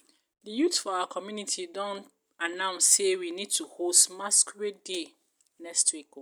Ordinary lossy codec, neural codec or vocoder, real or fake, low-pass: none; none; real; none